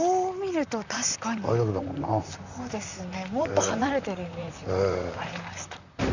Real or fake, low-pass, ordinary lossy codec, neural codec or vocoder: fake; 7.2 kHz; none; vocoder, 44.1 kHz, 128 mel bands, Pupu-Vocoder